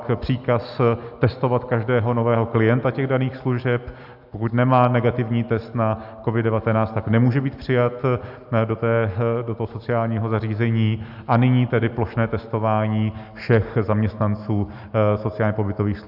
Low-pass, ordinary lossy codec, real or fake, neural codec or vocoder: 5.4 kHz; AAC, 48 kbps; real; none